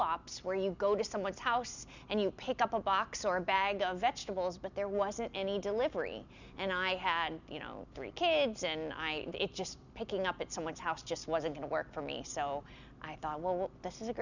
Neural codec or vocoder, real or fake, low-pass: none; real; 7.2 kHz